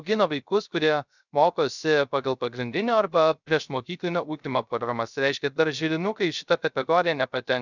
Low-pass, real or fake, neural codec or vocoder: 7.2 kHz; fake; codec, 16 kHz, 0.3 kbps, FocalCodec